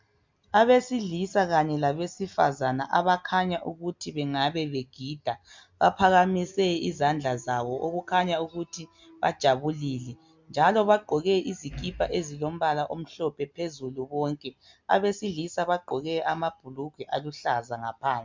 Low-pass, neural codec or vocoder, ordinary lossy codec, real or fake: 7.2 kHz; none; MP3, 64 kbps; real